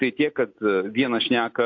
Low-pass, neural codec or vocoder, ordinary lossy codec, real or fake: 7.2 kHz; none; AAC, 48 kbps; real